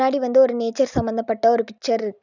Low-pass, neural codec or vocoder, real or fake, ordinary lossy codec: 7.2 kHz; none; real; none